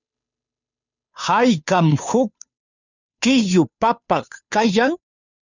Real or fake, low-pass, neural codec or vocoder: fake; 7.2 kHz; codec, 16 kHz, 2 kbps, FunCodec, trained on Chinese and English, 25 frames a second